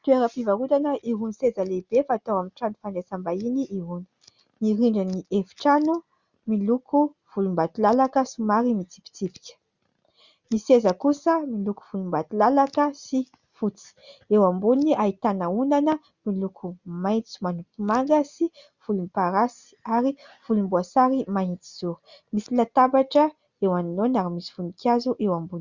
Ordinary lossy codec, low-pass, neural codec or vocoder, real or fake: Opus, 64 kbps; 7.2 kHz; none; real